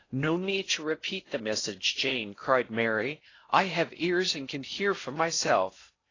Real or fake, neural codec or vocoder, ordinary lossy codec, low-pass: fake; codec, 16 kHz in and 24 kHz out, 0.6 kbps, FocalCodec, streaming, 4096 codes; AAC, 32 kbps; 7.2 kHz